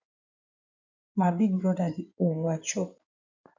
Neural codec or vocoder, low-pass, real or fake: codec, 16 kHz in and 24 kHz out, 2.2 kbps, FireRedTTS-2 codec; 7.2 kHz; fake